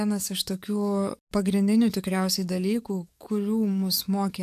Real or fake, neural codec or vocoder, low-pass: fake; codec, 44.1 kHz, 7.8 kbps, DAC; 14.4 kHz